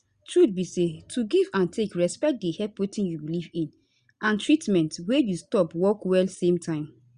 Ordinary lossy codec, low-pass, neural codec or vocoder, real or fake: Opus, 64 kbps; 9.9 kHz; none; real